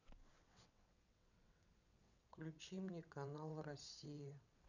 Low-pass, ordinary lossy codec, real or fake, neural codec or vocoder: 7.2 kHz; none; fake; vocoder, 22.05 kHz, 80 mel bands, WaveNeXt